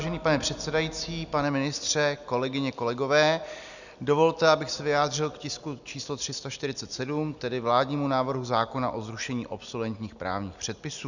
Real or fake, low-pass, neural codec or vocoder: real; 7.2 kHz; none